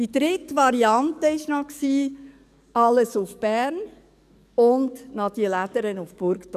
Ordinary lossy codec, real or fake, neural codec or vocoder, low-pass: none; fake; codec, 44.1 kHz, 7.8 kbps, DAC; 14.4 kHz